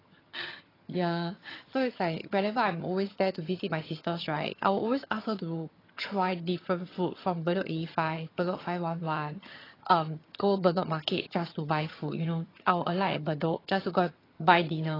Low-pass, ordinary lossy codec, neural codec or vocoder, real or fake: 5.4 kHz; AAC, 24 kbps; vocoder, 22.05 kHz, 80 mel bands, HiFi-GAN; fake